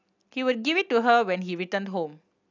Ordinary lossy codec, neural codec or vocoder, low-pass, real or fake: none; none; 7.2 kHz; real